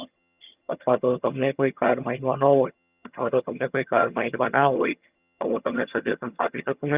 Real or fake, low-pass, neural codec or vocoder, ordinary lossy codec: fake; 3.6 kHz; vocoder, 22.05 kHz, 80 mel bands, HiFi-GAN; Opus, 64 kbps